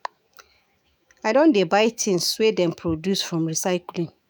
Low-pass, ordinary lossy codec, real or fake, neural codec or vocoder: none; none; fake; autoencoder, 48 kHz, 128 numbers a frame, DAC-VAE, trained on Japanese speech